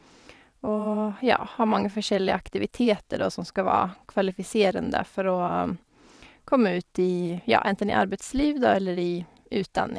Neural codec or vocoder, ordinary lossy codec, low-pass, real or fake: vocoder, 22.05 kHz, 80 mel bands, WaveNeXt; none; none; fake